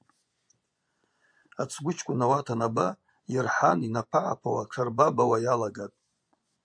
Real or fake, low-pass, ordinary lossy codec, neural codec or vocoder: fake; 9.9 kHz; MP3, 64 kbps; vocoder, 24 kHz, 100 mel bands, Vocos